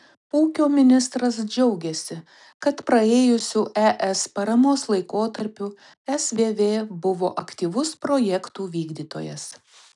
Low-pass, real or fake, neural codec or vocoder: 10.8 kHz; real; none